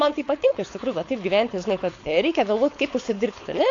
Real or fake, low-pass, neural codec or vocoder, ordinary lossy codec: fake; 7.2 kHz; codec, 16 kHz, 4.8 kbps, FACodec; AAC, 48 kbps